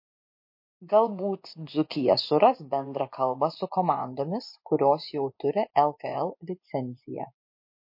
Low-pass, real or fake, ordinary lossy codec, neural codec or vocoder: 5.4 kHz; fake; MP3, 32 kbps; vocoder, 24 kHz, 100 mel bands, Vocos